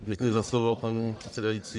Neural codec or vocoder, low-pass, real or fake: codec, 44.1 kHz, 1.7 kbps, Pupu-Codec; 10.8 kHz; fake